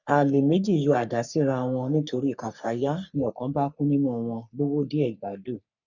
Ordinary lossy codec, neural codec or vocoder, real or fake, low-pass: none; codec, 44.1 kHz, 3.4 kbps, Pupu-Codec; fake; 7.2 kHz